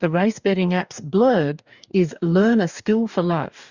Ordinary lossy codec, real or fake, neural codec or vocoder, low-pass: Opus, 64 kbps; fake; codec, 44.1 kHz, 2.6 kbps, DAC; 7.2 kHz